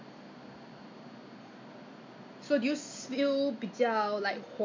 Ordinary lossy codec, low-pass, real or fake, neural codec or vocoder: MP3, 64 kbps; 7.2 kHz; real; none